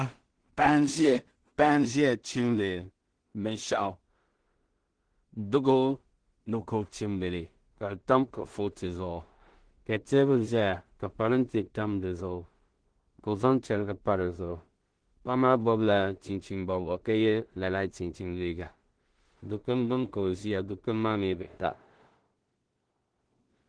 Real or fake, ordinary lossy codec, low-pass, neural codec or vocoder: fake; Opus, 16 kbps; 9.9 kHz; codec, 16 kHz in and 24 kHz out, 0.4 kbps, LongCat-Audio-Codec, two codebook decoder